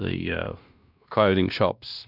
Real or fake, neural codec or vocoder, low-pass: fake; codec, 16 kHz, 1 kbps, X-Codec, WavLM features, trained on Multilingual LibriSpeech; 5.4 kHz